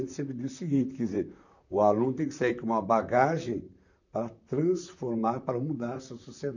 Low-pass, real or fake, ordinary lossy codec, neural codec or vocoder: 7.2 kHz; fake; none; vocoder, 44.1 kHz, 128 mel bands, Pupu-Vocoder